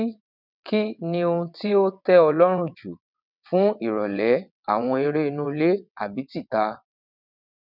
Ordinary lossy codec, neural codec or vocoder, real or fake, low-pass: none; vocoder, 22.05 kHz, 80 mel bands, WaveNeXt; fake; 5.4 kHz